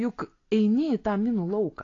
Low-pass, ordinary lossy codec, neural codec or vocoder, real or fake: 7.2 kHz; AAC, 32 kbps; none; real